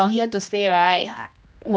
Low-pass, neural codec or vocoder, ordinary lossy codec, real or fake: none; codec, 16 kHz, 1 kbps, X-Codec, HuBERT features, trained on general audio; none; fake